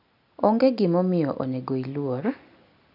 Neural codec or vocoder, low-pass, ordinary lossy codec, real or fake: none; 5.4 kHz; none; real